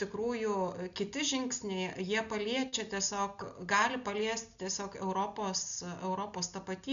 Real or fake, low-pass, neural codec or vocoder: real; 7.2 kHz; none